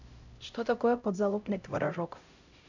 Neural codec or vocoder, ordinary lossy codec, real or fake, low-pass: codec, 16 kHz, 0.5 kbps, X-Codec, HuBERT features, trained on LibriSpeech; none; fake; 7.2 kHz